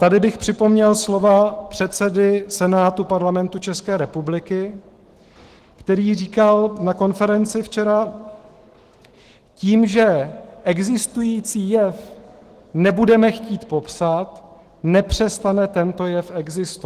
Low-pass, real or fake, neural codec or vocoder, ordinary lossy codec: 14.4 kHz; fake; autoencoder, 48 kHz, 128 numbers a frame, DAC-VAE, trained on Japanese speech; Opus, 16 kbps